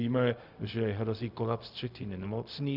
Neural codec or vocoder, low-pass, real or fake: codec, 16 kHz, 0.4 kbps, LongCat-Audio-Codec; 5.4 kHz; fake